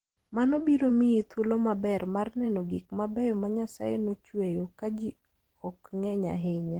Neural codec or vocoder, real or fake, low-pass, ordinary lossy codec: vocoder, 44.1 kHz, 128 mel bands every 512 samples, BigVGAN v2; fake; 19.8 kHz; Opus, 16 kbps